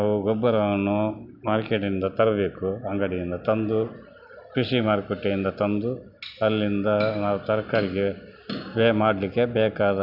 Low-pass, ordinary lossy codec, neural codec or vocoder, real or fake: 5.4 kHz; none; none; real